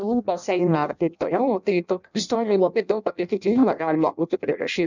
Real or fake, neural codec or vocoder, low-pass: fake; codec, 16 kHz in and 24 kHz out, 0.6 kbps, FireRedTTS-2 codec; 7.2 kHz